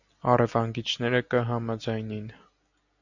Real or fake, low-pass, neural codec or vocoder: real; 7.2 kHz; none